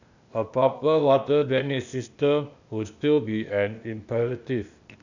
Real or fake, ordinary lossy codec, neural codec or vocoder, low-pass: fake; none; codec, 16 kHz, 0.8 kbps, ZipCodec; 7.2 kHz